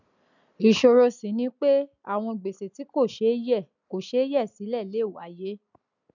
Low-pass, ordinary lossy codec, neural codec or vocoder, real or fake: 7.2 kHz; none; none; real